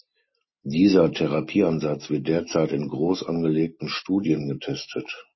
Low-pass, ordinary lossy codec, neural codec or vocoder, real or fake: 7.2 kHz; MP3, 24 kbps; none; real